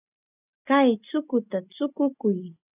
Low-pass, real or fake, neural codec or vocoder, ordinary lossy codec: 3.6 kHz; real; none; AAC, 32 kbps